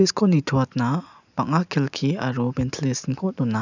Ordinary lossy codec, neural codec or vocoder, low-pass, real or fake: none; none; 7.2 kHz; real